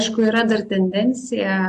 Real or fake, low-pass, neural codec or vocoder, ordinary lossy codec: real; 14.4 kHz; none; MP3, 64 kbps